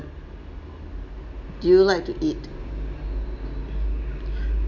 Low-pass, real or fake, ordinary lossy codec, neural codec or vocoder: 7.2 kHz; real; none; none